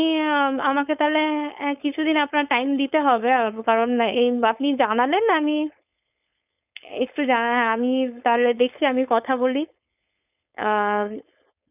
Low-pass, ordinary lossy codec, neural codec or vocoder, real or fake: 3.6 kHz; none; codec, 16 kHz, 4.8 kbps, FACodec; fake